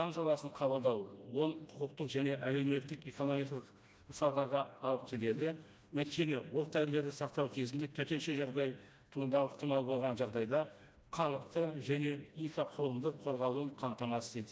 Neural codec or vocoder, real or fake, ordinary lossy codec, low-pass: codec, 16 kHz, 1 kbps, FreqCodec, smaller model; fake; none; none